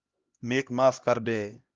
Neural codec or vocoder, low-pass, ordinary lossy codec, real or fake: codec, 16 kHz, 1 kbps, X-Codec, HuBERT features, trained on LibriSpeech; 7.2 kHz; Opus, 32 kbps; fake